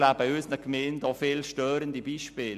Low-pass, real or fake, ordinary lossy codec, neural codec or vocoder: 14.4 kHz; real; MP3, 96 kbps; none